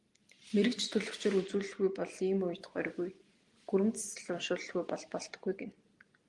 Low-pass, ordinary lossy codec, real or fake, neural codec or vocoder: 10.8 kHz; Opus, 24 kbps; real; none